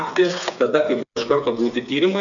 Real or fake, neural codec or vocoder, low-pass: fake; codec, 16 kHz, 4 kbps, FreqCodec, smaller model; 7.2 kHz